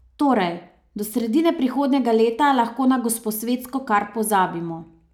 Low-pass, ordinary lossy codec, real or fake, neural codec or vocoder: 19.8 kHz; none; real; none